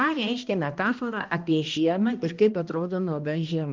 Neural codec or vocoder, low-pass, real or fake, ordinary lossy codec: codec, 16 kHz, 1 kbps, X-Codec, HuBERT features, trained on balanced general audio; 7.2 kHz; fake; Opus, 32 kbps